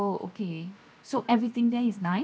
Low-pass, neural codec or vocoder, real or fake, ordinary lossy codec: none; codec, 16 kHz, 0.7 kbps, FocalCodec; fake; none